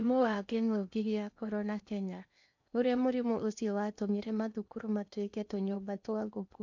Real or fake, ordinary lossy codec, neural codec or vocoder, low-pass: fake; none; codec, 16 kHz in and 24 kHz out, 0.8 kbps, FocalCodec, streaming, 65536 codes; 7.2 kHz